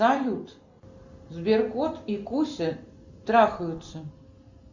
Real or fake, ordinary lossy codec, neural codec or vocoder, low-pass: real; Opus, 64 kbps; none; 7.2 kHz